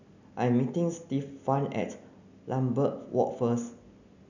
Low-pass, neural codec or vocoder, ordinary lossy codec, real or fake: 7.2 kHz; none; none; real